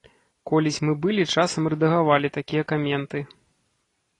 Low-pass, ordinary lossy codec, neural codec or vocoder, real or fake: 10.8 kHz; AAC, 32 kbps; none; real